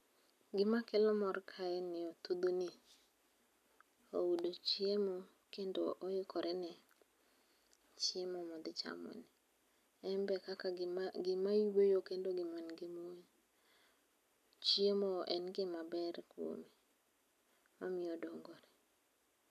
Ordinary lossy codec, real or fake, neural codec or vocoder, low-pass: none; real; none; 14.4 kHz